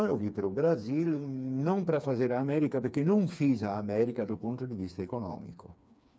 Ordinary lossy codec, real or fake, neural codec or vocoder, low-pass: none; fake; codec, 16 kHz, 4 kbps, FreqCodec, smaller model; none